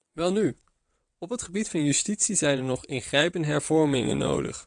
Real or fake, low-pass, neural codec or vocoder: fake; 9.9 kHz; vocoder, 22.05 kHz, 80 mel bands, WaveNeXt